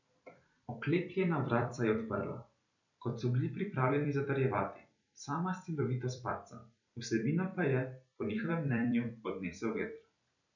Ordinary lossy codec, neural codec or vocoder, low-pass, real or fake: none; vocoder, 44.1 kHz, 128 mel bands every 256 samples, BigVGAN v2; 7.2 kHz; fake